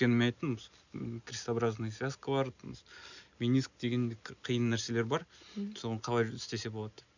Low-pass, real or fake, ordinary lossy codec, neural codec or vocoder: 7.2 kHz; real; none; none